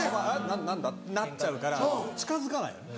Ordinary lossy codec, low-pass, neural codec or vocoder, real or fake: none; none; none; real